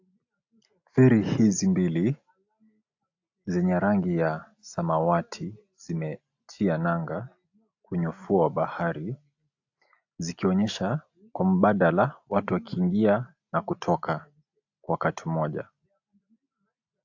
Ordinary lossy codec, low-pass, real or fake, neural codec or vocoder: AAC, 48 kbps; 7.2 kHz; real; none